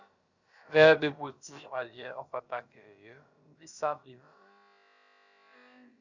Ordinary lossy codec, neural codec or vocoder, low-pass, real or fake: Opus, 64 kbps; codec, 16 kHz, about 1 kbps, DyCAST, with the encoder's durations; 7.2 kHz; fake